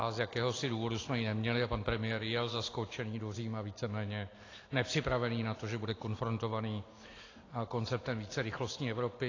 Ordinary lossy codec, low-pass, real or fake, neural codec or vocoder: AAC, 32 kbps; 7.2 kHz; real; none